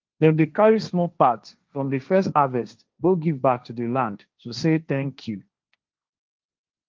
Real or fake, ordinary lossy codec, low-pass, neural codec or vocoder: fake; Opus, 24 kbps; 7.2 kHz; codec, 16 kHz, 1.1 kbps, Voila-Tokenizer